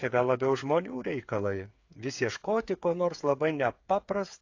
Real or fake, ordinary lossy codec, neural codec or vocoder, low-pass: fake; AAC, 48 kbps; codec, 16 kHz, 8 kbps, FreqCodec, smaller model; 7.2 kHz